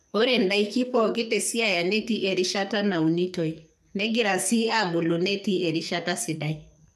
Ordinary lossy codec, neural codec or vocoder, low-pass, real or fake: none; codec, 44.1 kHz, 2.6 kbps, SNAC; 14.4 kHz; fake